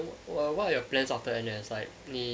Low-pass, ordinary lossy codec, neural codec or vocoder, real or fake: none; none; none; real